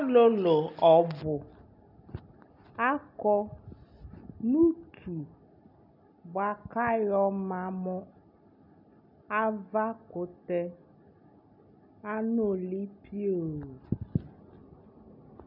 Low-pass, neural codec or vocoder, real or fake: 5.4 kHz; none; real